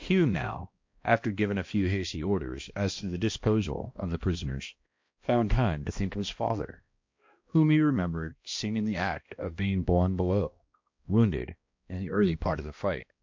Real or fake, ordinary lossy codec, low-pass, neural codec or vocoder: fake; MP3, 48 kbps; 7.2 kHz; codec, 16 kHz, 1 kbps, X-Codec, HuBERT features, trained on balanced general audio